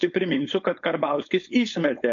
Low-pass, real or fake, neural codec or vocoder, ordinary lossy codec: 7.2 kHz; fake; codec, 16 kHz, 4.8 kbps, FACodec; AAC, 64 kbps